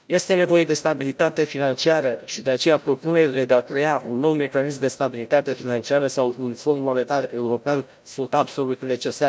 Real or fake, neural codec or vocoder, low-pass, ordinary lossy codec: fake; codec, 16 kHz, 0.5 kbps, FreqCodec, larger model; none; none